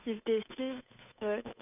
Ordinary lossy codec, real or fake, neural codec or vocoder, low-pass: none; fake; codec, 16 kHz, 8 kbps, FunCodec, trained on Chinese and English, 25 frames a second; 3.6 kHz